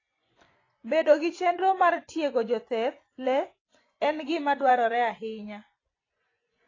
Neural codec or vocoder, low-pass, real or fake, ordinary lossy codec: vocoder, 24 kHz, 100 mel bands, Vocos; 7.2 kHz; fake; AAC, 32 kbps